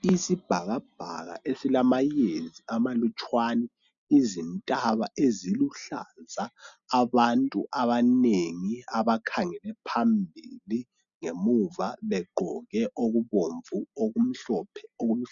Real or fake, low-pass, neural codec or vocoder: real; 7.2 kHz; none